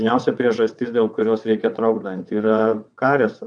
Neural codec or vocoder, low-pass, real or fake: vocoder, 22.05 kHz, 80 mel bands, WaveNeXt; 9.9 kHz; fake